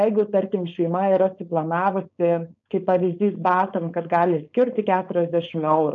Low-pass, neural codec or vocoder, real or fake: 7.2 kHz; codec, 16 kHz, 4.8 kbps, FACodec; fake